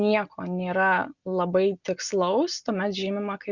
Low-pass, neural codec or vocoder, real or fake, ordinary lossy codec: 7.2 kHz; none; real; Opus, 64 kbps